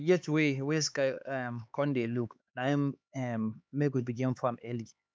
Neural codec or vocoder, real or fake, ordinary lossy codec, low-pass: codec, 16 kHz, 4 kbps, X-Codec, HuBERT features, trained on LibriSpeech; fake; none; none